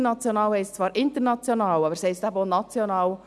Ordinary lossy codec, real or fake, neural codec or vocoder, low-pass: none; real; none; none